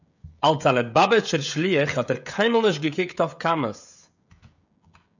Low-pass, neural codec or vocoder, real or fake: 7.2 kHz; codec, 16 kHz, 16 kbps, FreqCodec, smaller model; fake